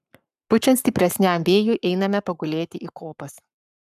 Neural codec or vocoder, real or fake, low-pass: codec, 44.1 kHz, 7.8 kbps, Pupu-Codec; fake; 14.4 kHz